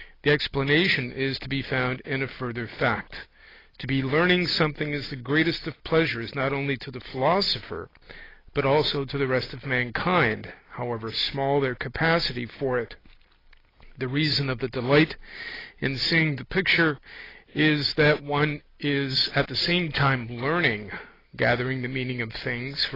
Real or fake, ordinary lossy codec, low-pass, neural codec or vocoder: real; AAC, 24 kbps; 5.4 kHz; none